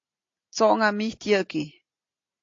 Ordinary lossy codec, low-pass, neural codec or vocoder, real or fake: AAC, 48 kbps; 7.2 kHz; none; real